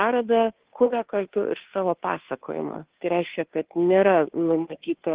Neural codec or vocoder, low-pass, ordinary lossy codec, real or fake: codec, 16 kHz, 2 kbps, FunCodec, trained on Chinese and English, 25 frames a second; 3.6 kHz; Opus, 16 kbps; fake